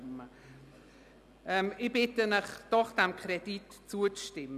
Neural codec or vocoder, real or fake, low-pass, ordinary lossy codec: none; real; 14.4 kHz; none